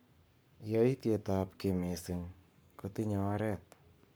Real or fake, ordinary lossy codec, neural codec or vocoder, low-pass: fake; none; codec, 44.1 kHz, 7.8 kbps, Pupu-Codec; none